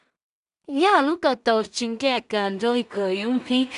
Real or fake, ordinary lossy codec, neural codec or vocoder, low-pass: fake; none; codec, 16 kHz in and 24 kHz out, 0.4 kbps, LongCat-Audio-Codec, two codebook decoder; 10.8 kHz